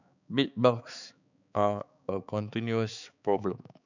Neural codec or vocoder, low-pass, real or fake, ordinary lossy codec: codec, 16 kHz, 4 kbps, X-Codec, HuBERT features, trained on balanced general audio; 7.2 kHz; fake; none